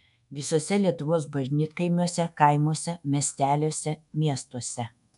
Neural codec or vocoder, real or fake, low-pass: codec, 24 kHz, 1.2 kbps, DualCodec; fake; 10.8 kHz